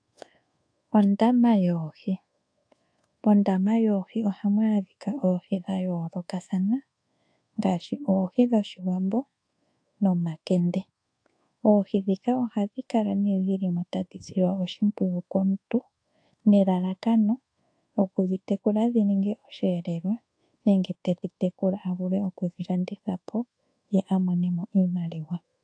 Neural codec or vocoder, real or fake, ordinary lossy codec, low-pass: codec, 24 kHz, 1.2 kbps, DualCodec; fake; AAC, 48 kbps; 9.9 kHz